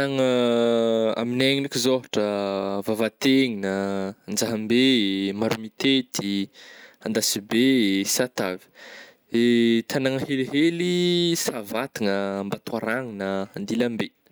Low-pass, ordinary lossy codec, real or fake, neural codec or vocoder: none; none; real; none